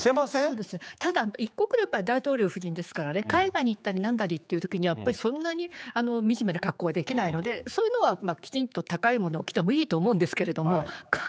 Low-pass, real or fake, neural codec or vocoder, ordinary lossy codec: none; fake; codec, 16 kHz, 4 kbps, X-Codec, HuBERT features, trained on general audio; none